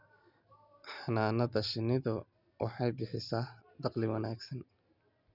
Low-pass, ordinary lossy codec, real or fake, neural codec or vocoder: 5.4 kHz; none; real; none